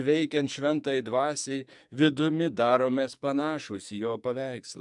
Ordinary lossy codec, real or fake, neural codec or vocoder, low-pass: MP3, 96 kbps; fake; codec, 44.1 kHz, 2.6 kbps, SNAC; 10.8 kHz